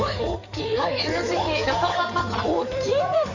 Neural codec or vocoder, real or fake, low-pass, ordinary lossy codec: codec, 16 kHz in and 24 kHz out, 2.2 kbps, FireRedTTS-2 codec; fake; 7.2 kHz; none